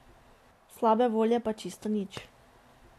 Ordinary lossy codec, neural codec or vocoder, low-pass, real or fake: AAC, 64 kbps; none; 14.4 kHz; real